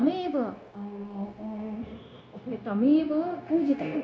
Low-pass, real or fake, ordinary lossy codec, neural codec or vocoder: none; fake; none; codec, 16 kHz, 0.9 kbps, LongCat-Audio-Codec